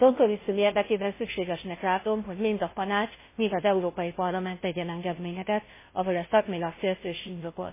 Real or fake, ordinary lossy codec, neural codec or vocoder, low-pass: fake; MP3, 16 kbps; codec, 16 kHz, 0.5 kbps, FunCodec, trained on Chinese and English, 25 frames a second; 3.6 kHz